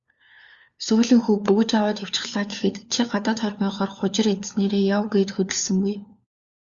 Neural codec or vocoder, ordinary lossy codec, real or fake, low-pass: codec, 16 kHz, 4 kbps, FunCodec, trained on LibriTTS, 50 frames a second; Opus, 64 kbps; fake; 7.2 kHz